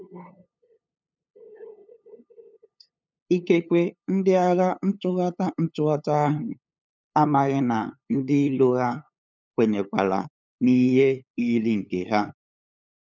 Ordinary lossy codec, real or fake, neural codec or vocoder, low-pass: none; fake; codec, 16 kHz, 8 kbps, FunCodec, trained on LibriTTS, 25 frames a second; none